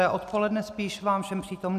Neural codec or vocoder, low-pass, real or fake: none; 14.4 kHz; real